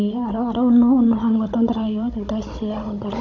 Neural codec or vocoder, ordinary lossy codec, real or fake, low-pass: codec, 16 kHz, 16 kbps, FunCodec, trained on Chinese and English, 50 frames a second; none; fake; 7.2 kHz